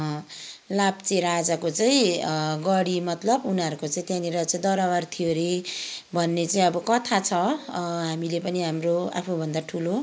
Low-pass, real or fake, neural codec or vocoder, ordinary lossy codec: none; real; none; none